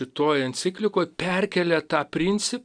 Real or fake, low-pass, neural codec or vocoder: real; 9.9 kHz; none